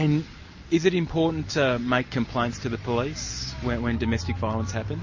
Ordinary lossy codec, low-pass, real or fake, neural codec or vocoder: MP3, 32 kbps; 7.2 kHz; real; none